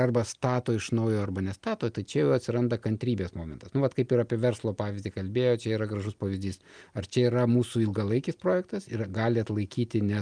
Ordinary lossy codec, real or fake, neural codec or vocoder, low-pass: Opus, 32 kbps; real; none; 9.9 kHz